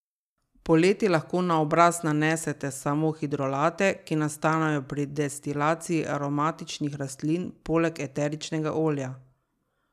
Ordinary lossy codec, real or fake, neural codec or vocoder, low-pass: none; real; none; 14.4 kHz